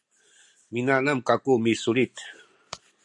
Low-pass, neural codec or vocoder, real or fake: 10.8 kHz; none; real